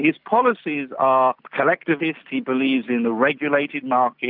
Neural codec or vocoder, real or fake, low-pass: none; real; 5.4 kHz